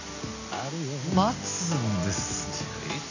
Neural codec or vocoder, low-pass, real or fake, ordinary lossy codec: none; 7.2 kHz; real; none